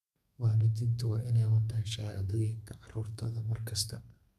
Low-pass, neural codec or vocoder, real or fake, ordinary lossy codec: 14.4 kHz; codec, 32 kHz, 1.9 kbps, SNAC; fake; none